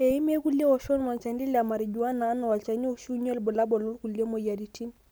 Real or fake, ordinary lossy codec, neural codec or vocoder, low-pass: real; none; none; none